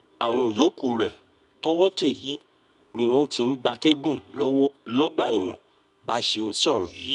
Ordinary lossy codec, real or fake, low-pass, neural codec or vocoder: none; fake; 10.8 kHz; codec, 24 kHz, 0.9 kbps, WavTokenizer, medium music audio release